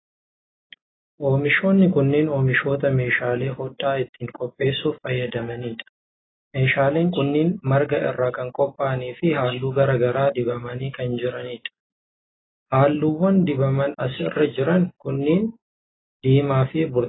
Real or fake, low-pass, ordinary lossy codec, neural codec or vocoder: real; 7.2 kHz; AAC, 16 kbps; none